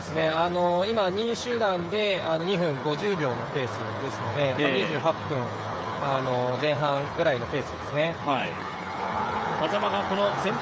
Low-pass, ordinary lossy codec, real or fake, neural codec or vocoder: none; none; fake; codec, 16 kHz, 8 kbps, FreqCodec, smaller model